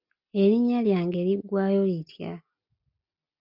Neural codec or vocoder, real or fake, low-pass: none; real; 5.4 kHz